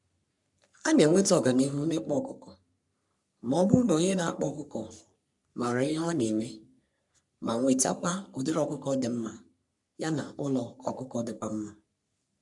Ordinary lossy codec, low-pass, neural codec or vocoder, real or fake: none; 10.8 kHz; codec, 44.1 kHz, 3.4 kbps, Pupu-Codec; fake